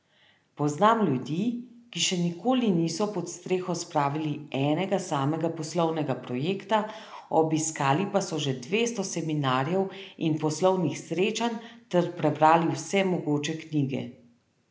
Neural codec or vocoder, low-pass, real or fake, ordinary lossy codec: none; none; real; none